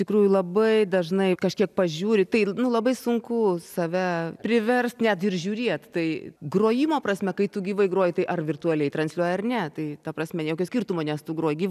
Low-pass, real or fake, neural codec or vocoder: 14.4 kHz; real; none